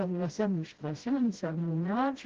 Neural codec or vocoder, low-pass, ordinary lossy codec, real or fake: codec, 16 kHz, 0.5 kbps, FreqCodec, smaller model; 7.2 kHz; Opus, 16 kbps; fake